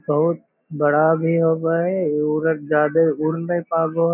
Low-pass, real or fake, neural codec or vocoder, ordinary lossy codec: 3.6 kHz; real; none; none